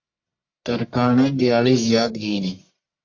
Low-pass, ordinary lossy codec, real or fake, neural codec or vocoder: 7.2 kHz; AAC, 48 kbps; fake; codec, 44.1 kHz, 1.7 kbps, Pupu-Codec